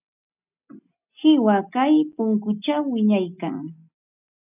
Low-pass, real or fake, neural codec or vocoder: 3.6 kHz; real; none